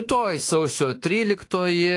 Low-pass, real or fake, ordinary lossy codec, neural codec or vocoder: 10.8 kHz; fake; AAC, 48 kbps; autoencoder, 48 kHz, 32 numbers a frame, DAC-VAE, trained on Japanese speech